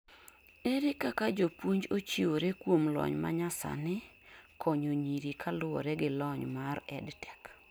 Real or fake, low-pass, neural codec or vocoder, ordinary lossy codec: real; none; none; none